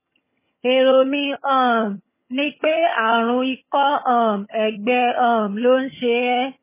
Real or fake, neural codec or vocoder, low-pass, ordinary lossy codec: fake; vocoder, 22.05 kHz, 80 mel bands, HiFi-GAN; 3.6 kHz; MP3, 16 kbps